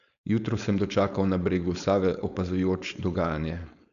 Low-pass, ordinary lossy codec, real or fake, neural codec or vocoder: 7.2 kHz; none; fake; codec, 16 kHz, 4.8 kbps, FACodec